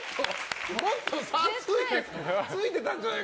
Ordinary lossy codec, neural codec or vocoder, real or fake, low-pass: none; none; real; none